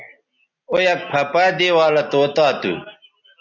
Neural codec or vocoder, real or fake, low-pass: none; real; 7.2 kHz